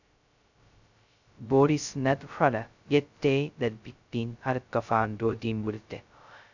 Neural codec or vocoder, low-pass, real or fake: codec, 16 kHz, 0.2 kbps, FocalCodec; 7.2 kHz; fake